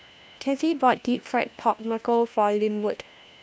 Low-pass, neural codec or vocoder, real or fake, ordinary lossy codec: none; codec, 16 kHz, 1 kbps, FunCodec, trained on LibriTTS, 50 frames a second; fake; none